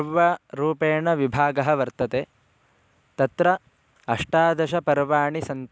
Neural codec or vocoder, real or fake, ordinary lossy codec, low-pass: none; real; none; none